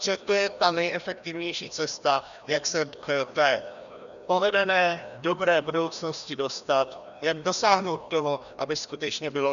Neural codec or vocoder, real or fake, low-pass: codec, 16 kHz, 1 kbps, FreqCodec, larger model; fake; 7.2 kHz